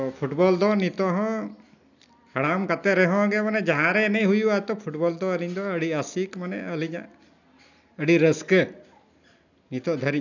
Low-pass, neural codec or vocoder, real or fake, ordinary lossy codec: 7.2 kHz; none; real; none